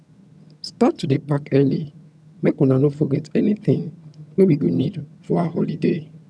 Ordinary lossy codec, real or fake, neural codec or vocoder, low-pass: none; fake; vocoder, 22.05 kHz, 80 mel bands, HiFi-GAN; none